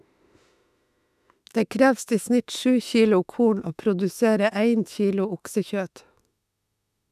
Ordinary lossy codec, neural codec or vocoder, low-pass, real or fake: none; autoencoder, 48 kHz, 32 numbers a frame, DAC-VAE, trained on Japanese speech; 14.4 kHz; fake